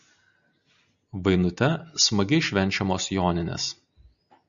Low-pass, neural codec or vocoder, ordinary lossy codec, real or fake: 7.2 kHz; none; MP3, 96 kbps; real